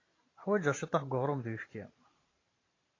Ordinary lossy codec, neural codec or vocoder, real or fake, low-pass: AAC, 32 kbps; vocoder, 44.1 kHz, 128 mel bands every 512 samples, BigVGAN v2; fake; 7.2 kHz